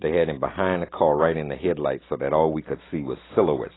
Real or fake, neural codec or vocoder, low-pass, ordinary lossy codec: real; none; 7.2 kHz; AAC, 16 kbps